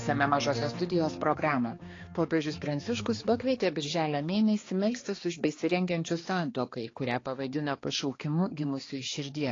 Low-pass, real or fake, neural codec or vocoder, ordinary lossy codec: 7.2 kHz; fake; codec, 16 kHz, 2 kbps, X-Codec, HuBERT features, trained on balanced general audio; AAC, 32 kbps